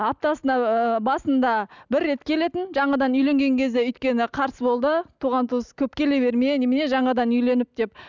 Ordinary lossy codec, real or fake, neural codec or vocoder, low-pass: none; real; none; 7.2 kHz